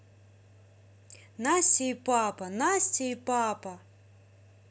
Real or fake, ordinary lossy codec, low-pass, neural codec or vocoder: real; none; none; none